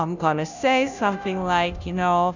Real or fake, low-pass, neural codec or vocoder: fake; 7.2 kHz; codec, 16 kHz, 0.5 kbps, FunCodec, trained on Chinese and English, 25 frames a second